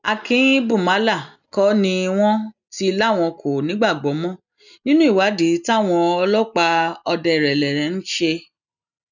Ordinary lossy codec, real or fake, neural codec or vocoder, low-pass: none; real; none; 7.2 kHz